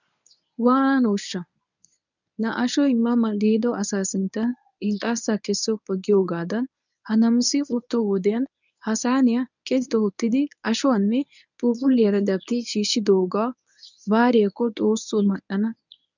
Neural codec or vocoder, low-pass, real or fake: codec, 24 kHz, 0.9 kbps, WavTokenizer, medium speech release version 1; 7.2 kHz; fake